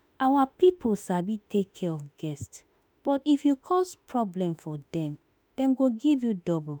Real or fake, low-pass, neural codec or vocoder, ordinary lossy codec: fake; 19.8 kHz; autoencoder, 48 kHz, 32 numbers a frame, DAC-VAE, trained on Japanese speech; none